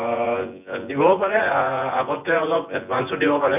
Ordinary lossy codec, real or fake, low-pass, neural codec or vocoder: none; fake; 3.6 kHz; vocoder, 24 kHz, 100 mel bands, Vocos